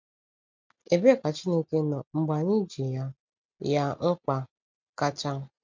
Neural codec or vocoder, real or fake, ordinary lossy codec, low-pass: none; real; MP3, 64 kbps; 7.2 kHz